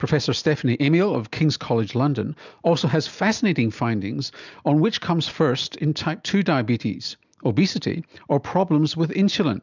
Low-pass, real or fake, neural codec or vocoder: 7.2 kHz; real; none